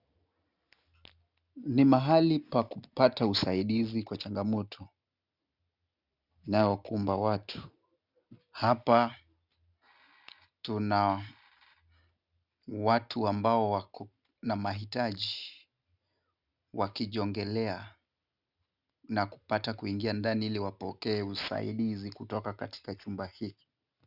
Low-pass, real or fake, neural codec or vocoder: 5.4 kHz; real; none